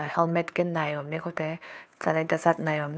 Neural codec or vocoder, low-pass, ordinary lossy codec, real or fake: codec, 16 kHz, 2 kbps, FunCodec, trained on Chinese and English, 25 frames a second; none; none; fake